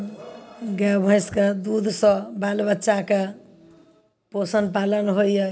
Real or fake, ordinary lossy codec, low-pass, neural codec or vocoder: real; none; none; none